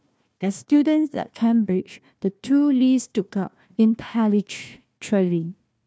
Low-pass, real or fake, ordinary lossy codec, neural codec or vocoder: none; fake; none; codec, 16 kHz, 1 kbps, FunCodec, trained on Chinese and English, 50 frames a second